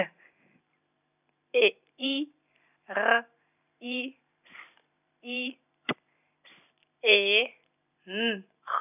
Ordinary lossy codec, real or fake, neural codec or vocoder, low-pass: none; fake; vocoder, 44.1 kHz, 128 mel bands every 512 samples, BigVGAN v2; 3.6 kHz